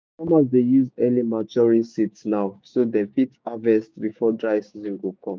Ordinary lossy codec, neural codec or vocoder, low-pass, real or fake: none; codec, 16 kHz, 6 kbps, DAC; none; fake